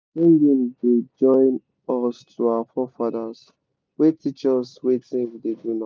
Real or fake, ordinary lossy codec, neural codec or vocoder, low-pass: real; none; none; none